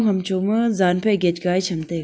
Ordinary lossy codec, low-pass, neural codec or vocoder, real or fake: none; none; none; real